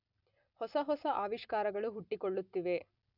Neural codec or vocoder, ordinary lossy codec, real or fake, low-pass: none; none; real; 5.4 kHz